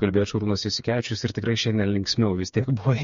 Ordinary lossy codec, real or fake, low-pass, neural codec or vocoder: MP3, 48 kbps; fake; 7.2 kHz; codec, 16 kHz, 4 kbps, FreqCodec, smaller model